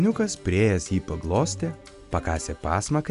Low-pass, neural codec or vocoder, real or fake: 10.8 kHz; none; real